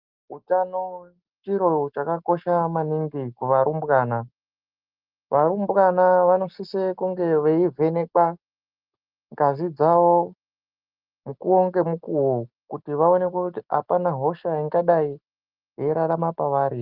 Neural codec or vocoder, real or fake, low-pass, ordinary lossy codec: none; real; 5.4 kHz; Opus, 32 kbps